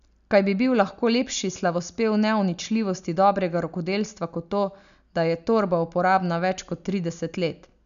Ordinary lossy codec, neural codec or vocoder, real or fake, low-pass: none; none; real; 7.2 kHz